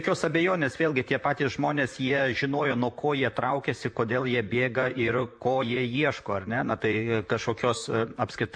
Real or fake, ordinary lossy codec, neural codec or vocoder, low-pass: fake; MP3, 48 kbps; vocoder, 44.1 kHz, 128 mel bands, Pupu-Vocoder; 9.9 kHz